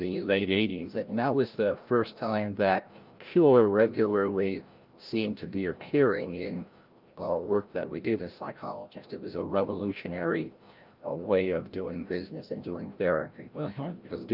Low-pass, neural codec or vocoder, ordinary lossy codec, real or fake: 5.4 kHz; codec, 16 kHz, 0.5 kbps, FreqCodec, larger model; Opus, 24 kbps; fake